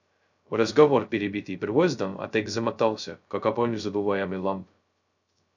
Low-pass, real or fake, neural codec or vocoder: 7.2 kHz; fake; codec, 16 kHz, 0.2 kbps, FocalCodec